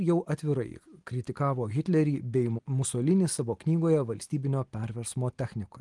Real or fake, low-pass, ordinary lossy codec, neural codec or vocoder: real; 10.8 kHz; Opus, 24 kbps; none